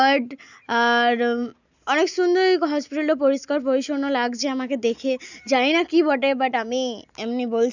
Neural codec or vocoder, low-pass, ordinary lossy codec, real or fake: none; 7.2 kHz; none; real